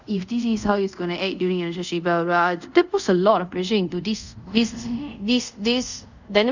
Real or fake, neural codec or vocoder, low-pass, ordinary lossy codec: fake; codec, 24 kHz, 0.5 kbps, DualCodec; 7.2 kHz; none